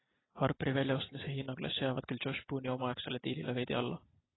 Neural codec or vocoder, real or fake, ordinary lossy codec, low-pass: none; real; AAC, 16 kbps; 7.2 kHz